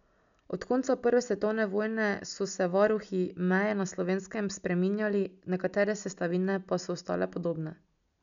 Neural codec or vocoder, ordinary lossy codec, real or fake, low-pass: none; none; real; 7.2 kHz